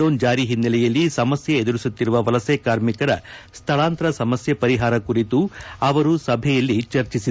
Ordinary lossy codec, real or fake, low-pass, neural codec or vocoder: none; real; none; none